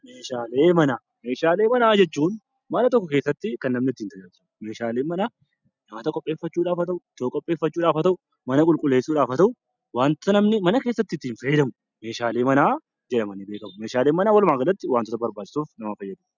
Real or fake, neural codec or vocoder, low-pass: real; none; 7.2 kHz